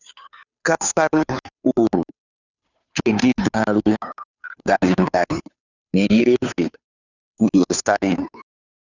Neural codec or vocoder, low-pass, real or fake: codec, 16 kHz, 2 kbps, FunCodec, trained on Chinese and English, 25 frames a second; 7.2 kHz; fake